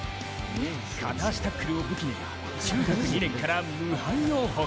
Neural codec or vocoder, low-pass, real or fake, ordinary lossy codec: none; none; real; none